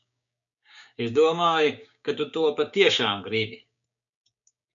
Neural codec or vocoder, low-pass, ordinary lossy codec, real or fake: codec, 16 kHz, 6 kbps, DAC; 7.2 kHz; MP3, 96 kbps; fake